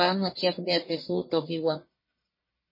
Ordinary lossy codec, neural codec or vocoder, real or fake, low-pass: MP3, 24 kbps; codec, 44.1 kHz, 1.7 kbps, Pupu-Codec; fake; 5.4 kHz